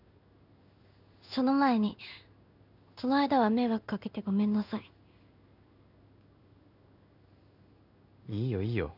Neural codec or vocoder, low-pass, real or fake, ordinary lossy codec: codec, 16 kHz in and 24 kHz out, 1 kbps, XY-Tokenizer; 5.4 kHz; fake; none